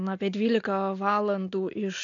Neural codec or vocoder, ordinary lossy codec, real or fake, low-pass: none; AAC, 48 kbps; real; 7.2 kHz